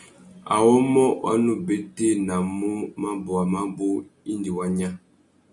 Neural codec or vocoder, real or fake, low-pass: none; real; 10.8 kHz